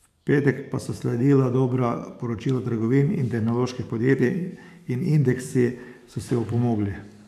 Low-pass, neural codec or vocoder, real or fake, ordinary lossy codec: 14.4 kHz; codec, 44.1 kHz, 7.8 kbps, DAC; fake; AAC, 96 kbps